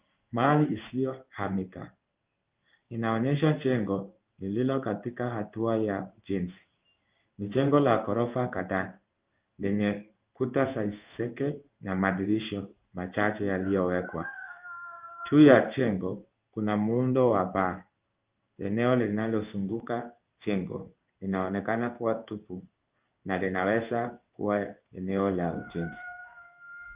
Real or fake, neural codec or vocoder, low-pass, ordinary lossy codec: fake; codec, 16 kHz in and 24 kHz out, 1 kbps, XY-Tokenizer; 3.6 kHz; Opus, 32 kbps